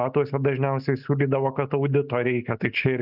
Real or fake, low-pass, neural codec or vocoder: real; 5.4 kHz; none